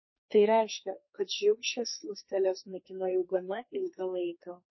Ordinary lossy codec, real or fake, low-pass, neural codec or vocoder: MP3, 24 kbps; fake; 7.2 kHz; codec, 32 kHz, 1.9 kbps, SNAC